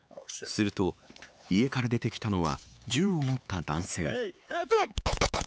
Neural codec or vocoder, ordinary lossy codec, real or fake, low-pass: codec, 16 kHz, 4 kbps, X-Codec, HuBERT features, trained on LibriSpeech; none; fake; none